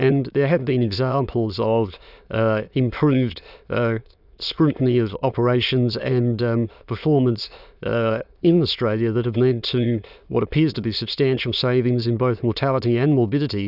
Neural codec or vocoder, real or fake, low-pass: autoencoder, 22.05 kHz, a latent of 192 numbers a frame, VITS, trained on many speakers; fake; 5.4 kHz